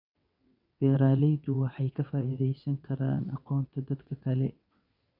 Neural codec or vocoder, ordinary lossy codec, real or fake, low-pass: vocoder, 22.05 kHz, 80 mel bands, WaveNeXt; none; fake; 5.4 kHz